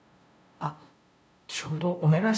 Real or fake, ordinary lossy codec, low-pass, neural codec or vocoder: fake; none; none; codec, 16 kHz, 0.5 kbps, FunCodec, trained on LibriTTS, 25 frames a second